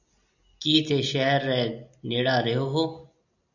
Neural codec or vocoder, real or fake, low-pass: none; real; 7.2 kHz